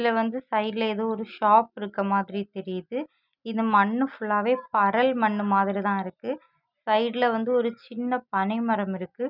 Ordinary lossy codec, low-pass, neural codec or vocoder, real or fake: none; 5.4 kHz; none; real